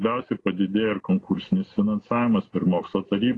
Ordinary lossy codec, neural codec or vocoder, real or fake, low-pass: AAC, 32 kbps; none; real; 10.8 kHz